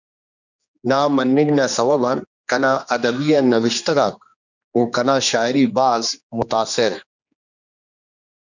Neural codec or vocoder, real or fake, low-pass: codec, 16 kHz, 2 kbps, X-Codec, HuBERT features, trained on general audio; fake; 7.2 kHz